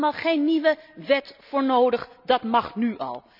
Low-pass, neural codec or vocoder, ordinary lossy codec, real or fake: 5.4 kHz; none; none; real